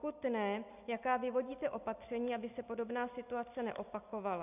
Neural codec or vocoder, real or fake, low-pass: none; real; 3.6 kHz